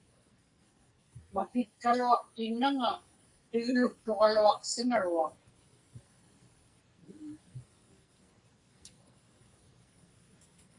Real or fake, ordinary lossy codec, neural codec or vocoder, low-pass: fake; Opus, 64 kbps; codec, 44.1 kHz, 2.6 kbps, SNAC; 10.8 kHz